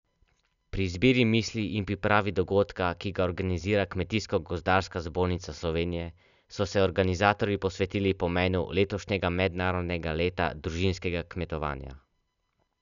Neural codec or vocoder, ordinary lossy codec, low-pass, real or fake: none; none; 7.2 kHz; real